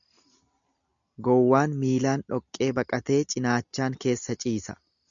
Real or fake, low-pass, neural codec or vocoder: real; 7.2 kHz; none